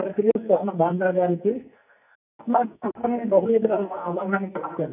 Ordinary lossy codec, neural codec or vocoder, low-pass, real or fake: none; codec, 44.1 kHz, 2.6 kbps, SNAC; 3.6 kHz; fake